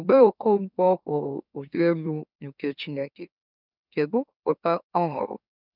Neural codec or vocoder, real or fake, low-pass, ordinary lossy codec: autoencoder, 44.1 kHz, a latent of 192 numbers a frame, MeloTTS; fake; 5.4 kHz; none